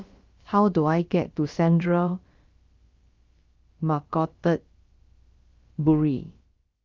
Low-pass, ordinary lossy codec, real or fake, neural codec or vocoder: 7.2 kHz; Opus, 32 kbps; fake; codec, 16 kHz, about 1 kbps, DyCAST, with the encoder's durations